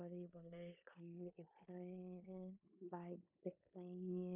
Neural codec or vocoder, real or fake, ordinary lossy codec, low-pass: codec, 16 kHz in and 24 kHz out, 0.9 kbps, LongCat-Audio-Codec, four codebook decoder; fake; none; 3.6 kHz